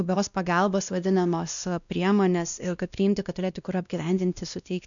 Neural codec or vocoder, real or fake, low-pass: codec, 16 kHz, 1 kbps, X-Codec, WavLM features, trained on Multilingual LibriSpeech; fake; 7.2 kHz